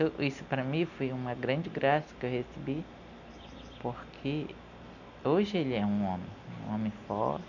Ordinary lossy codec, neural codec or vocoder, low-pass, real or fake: none; none; 7.2 kHz; real